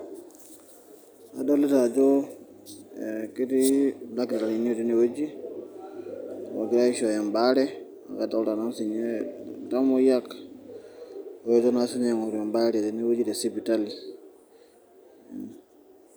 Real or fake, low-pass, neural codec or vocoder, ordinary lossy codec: real; none; none; none